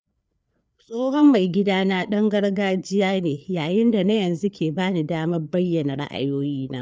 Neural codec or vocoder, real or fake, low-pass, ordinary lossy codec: codec, 16 kHz, 4 kbps, FreqCodec, larger model; fake; none; none